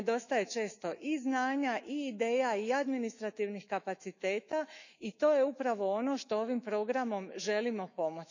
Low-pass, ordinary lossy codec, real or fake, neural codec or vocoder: 7.2 kHz; none; fake; autoencoder, 48 kHz, 128 numbers a frame, DAC-VAE, trained on Japanese speech